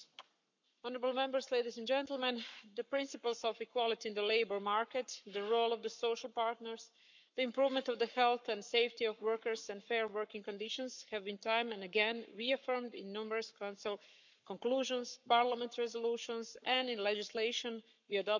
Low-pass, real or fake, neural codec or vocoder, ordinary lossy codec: 7.2 kHz; fake; codec, 44.1 kHz, 7.8 kbps, Pupu-Codec; none